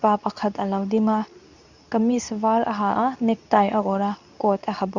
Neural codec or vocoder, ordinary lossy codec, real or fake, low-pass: codec, 24 kHz, 0.9 kbps, WavTokenizer, medium speech release version 2; none; fake; 7.2 kHz